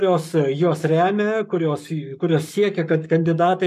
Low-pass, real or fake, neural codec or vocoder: 14.4 kHz; fake; codec, 44.1 kHz, 7.8 kbps, Pupu-Codec